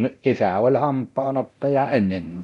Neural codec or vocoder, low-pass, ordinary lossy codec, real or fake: codec, 24 kHz, 0.9 kbps, DualCodec; 10.8 kHz; none; fake